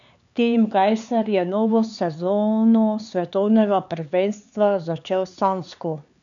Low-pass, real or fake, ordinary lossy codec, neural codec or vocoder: 7.2 kHz; fake; none; codec, 16 kHz, 4 kbps, X-Codec, HuBERT features, trained on LibriSpeech